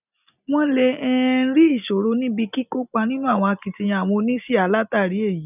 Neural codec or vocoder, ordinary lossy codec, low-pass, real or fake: none; none; 3.6 kHz; real